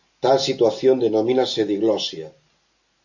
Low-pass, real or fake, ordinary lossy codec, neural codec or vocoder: 7.2 kHz; real; AAC, 48 kbps; none